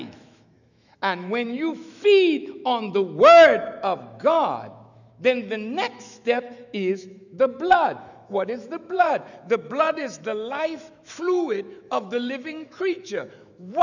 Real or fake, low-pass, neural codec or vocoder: fake; 7.2 kHz; autoencoder, 48 kHz, 128 numbers a frame, DAC-VAE, trained on Japanese speech